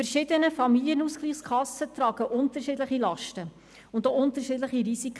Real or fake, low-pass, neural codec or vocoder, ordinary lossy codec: real; none; none; none